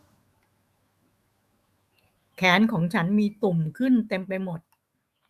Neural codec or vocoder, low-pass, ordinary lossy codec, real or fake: autoencoder, 48 kHz, 128 numbers a frame, DAC-VAE, trained on Japanese speech; 14.4 kHz; Opus, 64 kbps; fake